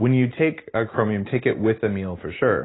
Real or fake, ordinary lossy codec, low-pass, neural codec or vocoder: real; AAC, 16 kbps; 7.2 kHz; none